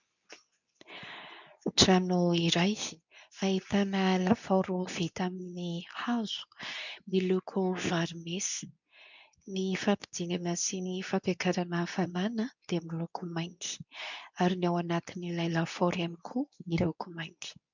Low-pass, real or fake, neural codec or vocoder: 7.2 kHz; fake; codec, 24 kHz, 0.9 kbps, WavTokenizer, medium speech release version 2